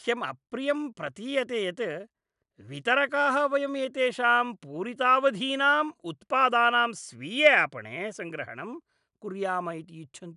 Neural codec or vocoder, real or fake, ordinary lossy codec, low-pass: none; real; none; 10.8 kHz